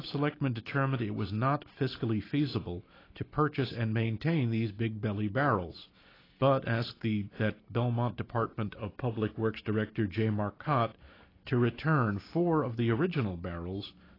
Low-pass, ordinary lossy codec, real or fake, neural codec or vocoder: 5.4 kHz; AAC, 24 kbps; real; none